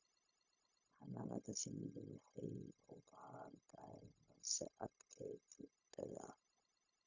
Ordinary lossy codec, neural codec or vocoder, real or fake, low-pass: none; codec, 16 kHz, 0.4 kbps, LongCat-Audio-Codec; fake; 7.2 kHz